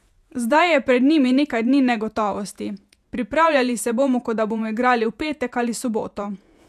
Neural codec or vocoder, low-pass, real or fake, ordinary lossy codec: vocoder, 48 kHz, 128 mel bands, Vocos; 14.4 kHz; fake; none